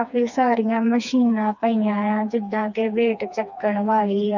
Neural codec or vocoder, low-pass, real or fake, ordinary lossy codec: codec, 16 kHz, 2 kbps, FreqCodec, smaller model; 7.2 kHz; fake; none